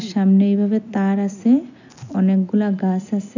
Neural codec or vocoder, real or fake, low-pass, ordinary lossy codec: none; real; 7.2 kHz; none